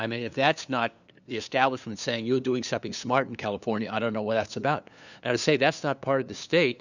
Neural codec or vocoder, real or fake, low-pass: codec, 16 kHz, 2 kbps, FunCodec, trained on LibriTTS, 25 frames a second; fake; 7.2 kHz